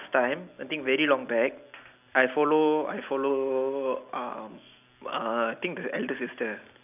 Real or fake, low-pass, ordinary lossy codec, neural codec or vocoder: real; 3.6 kHz; none; none